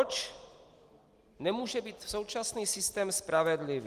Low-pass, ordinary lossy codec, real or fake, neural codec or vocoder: 14.4 kHz; Opus, 24 kbps; real; none